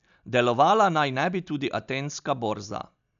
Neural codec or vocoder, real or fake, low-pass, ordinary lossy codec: none; real; 7.2 kHz; none